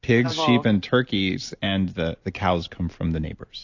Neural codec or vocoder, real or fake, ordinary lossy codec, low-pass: none; real; AAC, 48 kbps; 7.2 kHz